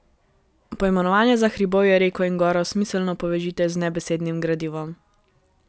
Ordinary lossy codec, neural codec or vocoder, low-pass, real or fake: none; none; none; real